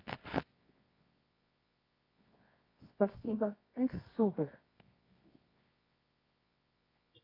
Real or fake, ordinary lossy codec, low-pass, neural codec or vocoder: fake; AAC, 24 kbps; 5.4 kHz; codec, 24 kHz, 0.9 kbps, WavTokenizer, medium music audio release